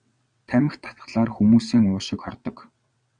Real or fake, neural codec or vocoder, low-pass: fake; vocoder, 22.05 kHz, 80 mel bands, WaveNeXt; 9.9 kHz